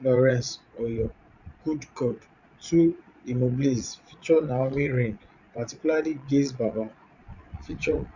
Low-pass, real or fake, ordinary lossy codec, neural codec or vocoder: 7.2 kHz; fake; none; vocoder, 22.05 kHz, 80 mel bands, Vocos